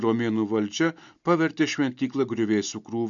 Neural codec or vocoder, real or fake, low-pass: none; real; 7.2 kHz